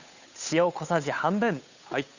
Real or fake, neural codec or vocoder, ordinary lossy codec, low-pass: fake; codec, 16 kHz, 8 kbps, FunCodec, trained on Chinese and English, 25 frames a second; none; 7.2 kHz